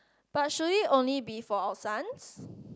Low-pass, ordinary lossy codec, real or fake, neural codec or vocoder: none; none; real; none